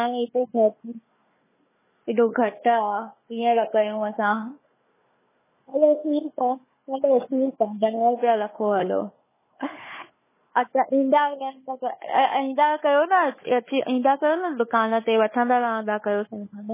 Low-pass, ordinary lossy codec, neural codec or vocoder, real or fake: 3.6 kHz; MP3, 16 kbps; codec, 16 kHz, 4 kbps, FunCodec, trained on Chinese and English, 50 frames a second; fake